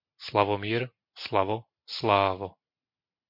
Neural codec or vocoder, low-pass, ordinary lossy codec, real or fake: none; 5.4 kHz; MP3, 32 kbps; real